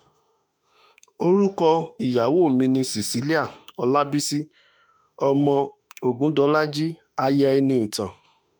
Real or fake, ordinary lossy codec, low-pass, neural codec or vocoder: fake; none; none; autoencoder, 48 kHz, 32 numbers a frame, DAC-VAE, trained on Japanese speech